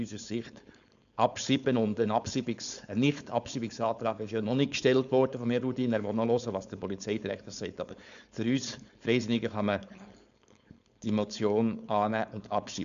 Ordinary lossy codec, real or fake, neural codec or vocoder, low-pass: MP3, 96 kbps; fake; codec, 16 kHz, 4.8 kbps, FACodec; 7.2 kHz